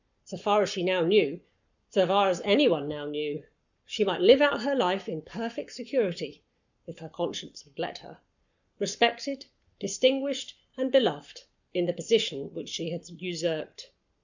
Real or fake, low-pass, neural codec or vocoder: fake; 7.2 kHz; codec, 44.1 kHz, 7.8 kbps, Pupu-Codec